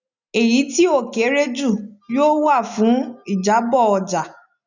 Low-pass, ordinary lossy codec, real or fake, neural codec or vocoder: 7.2 kHz; none; real; none